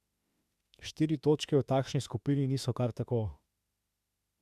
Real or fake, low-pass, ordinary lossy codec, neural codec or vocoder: fake; 14.4 kHz; Opus, 64 kbps; autoencoder, 48 kHz, 32 numbers a frame, DAC-VAE, trained on Japanese speech